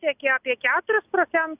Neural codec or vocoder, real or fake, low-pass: none; real; 3.6 kHz